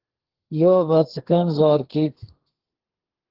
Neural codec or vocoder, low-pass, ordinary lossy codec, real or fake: codec, 32 kHz, 1.9 kbps, SNAC; 5.4 kHz; Opus, 16 kbps; fake